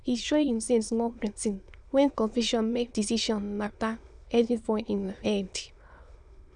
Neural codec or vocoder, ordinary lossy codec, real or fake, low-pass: autoencoder, 22.05 kHz, a latent of 192 numbers a frame, VITS, trained on many speakers; none; fake; 9.9 kHz